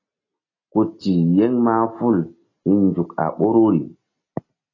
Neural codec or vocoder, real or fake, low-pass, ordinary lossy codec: none; real; 7.2 kHz; AAC, 32 kbps